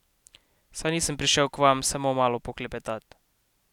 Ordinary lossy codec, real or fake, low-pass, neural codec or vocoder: none; real; 19.8 kHz; none